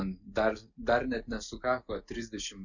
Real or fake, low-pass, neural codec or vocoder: real; 7.2 kHz; none